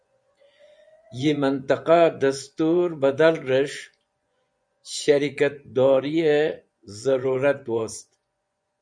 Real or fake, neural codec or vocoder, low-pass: fake; vocoder, 24 kHz, 100 mel bands, Vocos; 9.9 kHz